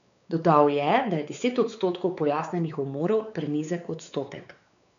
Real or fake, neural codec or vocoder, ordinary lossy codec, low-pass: fake; codec, 16 kHz, 4 kbps, X-Codec, WavLM features, trained on Multilingual LibriSpeech; none; 7.2 kHz